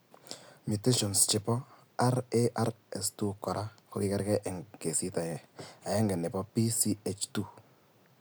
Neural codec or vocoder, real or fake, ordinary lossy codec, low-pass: none; real; none; none